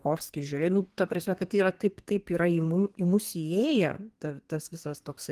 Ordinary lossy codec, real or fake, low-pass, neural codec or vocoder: Opus, 24 kbps; fake; 14.4 kHz; codec, 32 kHz, 1.9 kbps, SNAC